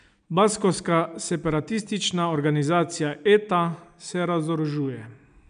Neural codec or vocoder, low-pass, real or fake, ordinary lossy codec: none; 9.9 kHz; real; none